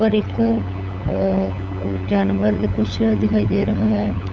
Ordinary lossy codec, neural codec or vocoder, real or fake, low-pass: none; codec, 16 kHz, 8 kbps, FunCodec, trained on LibriTTS, 25 frames a second; fake; none